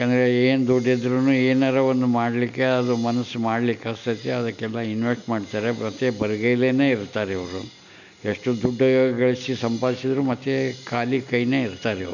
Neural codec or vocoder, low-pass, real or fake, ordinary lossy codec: none; 7.2 kHz; real; none